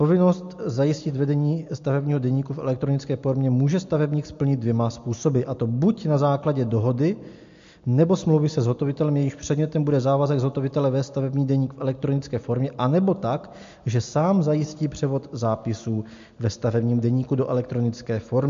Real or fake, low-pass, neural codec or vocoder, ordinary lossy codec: real; 7.2 kHz; none; MP3, 48 kbps